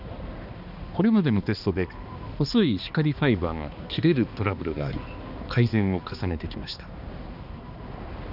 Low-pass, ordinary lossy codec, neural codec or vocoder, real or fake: 5.4 kHz; Opus, 64 kbps; codec, 16 kHz, 2 kbps, X-Codec, HuBERT features, trained on balanced general audio; fake